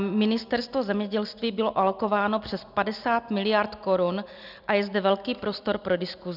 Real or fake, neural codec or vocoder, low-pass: real; none; 5.4 kHz